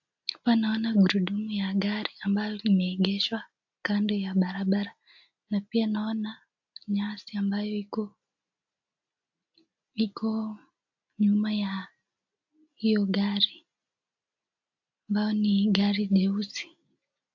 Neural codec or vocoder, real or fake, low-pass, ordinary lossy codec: none; real; 7.2 kHz; AAC, 48 kbps